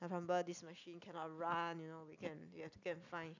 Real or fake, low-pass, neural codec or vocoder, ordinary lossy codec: real; 7.2 kHz; none; none